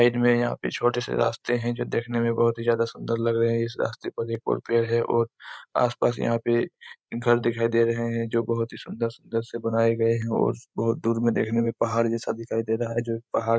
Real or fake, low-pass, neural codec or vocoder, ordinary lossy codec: fake; none; codec, 16 kHz, 16 kbps, FreqCodec, larger model; none